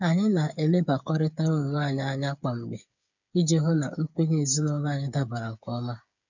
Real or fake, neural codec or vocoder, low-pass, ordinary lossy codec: fake; codec, 16 kHz, 8 kbps, FreqCodec, smaller model; 7.2 kHz; none